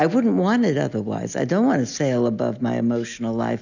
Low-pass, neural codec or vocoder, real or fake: 7.2 kHz; none; real